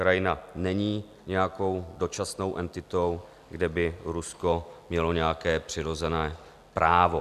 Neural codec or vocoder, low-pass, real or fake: none; 14.4 kHz; real